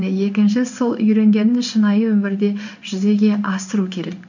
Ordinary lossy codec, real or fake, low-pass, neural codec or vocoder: none; fake; 7.2 kHz; codec, 16 kHz in and 24 kHz out, 1 kbps, XY-Tokenizer